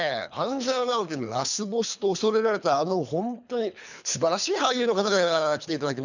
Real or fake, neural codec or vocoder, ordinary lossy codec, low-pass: fake; codec, 24 kHz, 3 kbps, HILCodec; none; 7.2 kHz